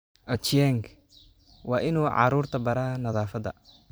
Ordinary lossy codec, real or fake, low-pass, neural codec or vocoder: none; fake; none; vocoder, 44.1 kHz, 128 mel bands every 256 samples, BigVGAN v2